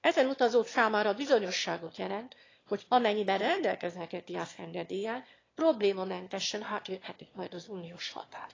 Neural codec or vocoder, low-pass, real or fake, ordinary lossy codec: autoencoder, 22.05 kHz, a latent of 192 numbers a frame, VITS, trained on one speaker; 7.2 kHz; fake; AAC, 32 kbps